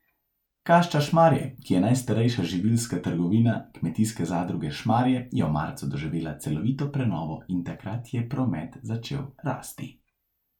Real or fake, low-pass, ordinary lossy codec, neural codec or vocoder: real; 19.8 kHz; none; none